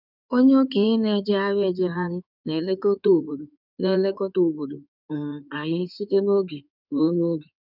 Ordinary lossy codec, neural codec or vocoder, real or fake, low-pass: none; codec, 16 kHz in and 24 kHz out, 2.2 kbps, FireRedTTS-2 codec; fake; 5.4 kHz